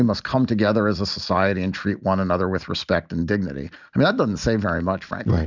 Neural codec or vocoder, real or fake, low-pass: none; real; 7.2 kHz